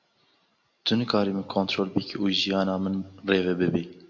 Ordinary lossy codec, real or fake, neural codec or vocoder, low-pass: AAC, 48 kbps; real; none; 7.2 kHz